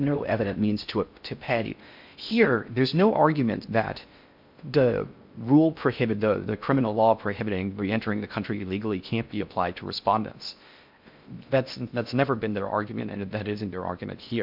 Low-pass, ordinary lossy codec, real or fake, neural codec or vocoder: 5.4 kHz; MP3, 48 kbps; fake; codec, 16 kHz in and 24 kHz out, 0.6 kbps, FocalCodec, streaming, 4096 codes